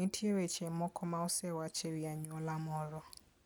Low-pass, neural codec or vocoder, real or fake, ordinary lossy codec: none; none; real; none